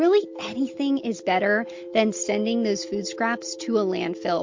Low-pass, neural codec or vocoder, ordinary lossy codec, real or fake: 7.2 kHz; none; MP3, 48 kbps; real